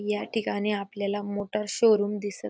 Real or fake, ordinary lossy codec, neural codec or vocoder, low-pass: real; none; none; none